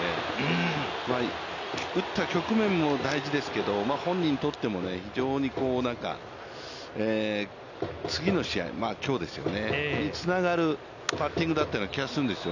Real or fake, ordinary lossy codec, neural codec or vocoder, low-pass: real; none; none; 7.2 kHz